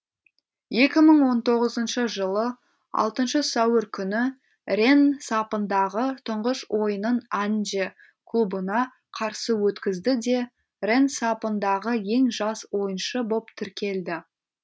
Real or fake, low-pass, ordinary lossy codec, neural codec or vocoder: real; none; none; none